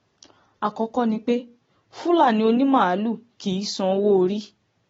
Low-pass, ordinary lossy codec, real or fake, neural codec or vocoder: 19.8 kHz; AAC, 24 kbps; real; none